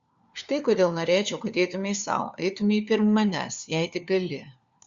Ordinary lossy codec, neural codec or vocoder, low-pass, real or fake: Opus, 64 kbps; codec, 16 kHz, 4 kbps, FunCodec, trained on LibriTTS, 50 frames a second; 7.2 kHz; fake